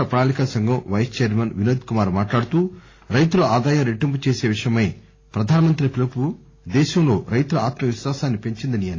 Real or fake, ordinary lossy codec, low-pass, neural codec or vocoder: real; AAC, 32 kbps; 7.2 kHz; none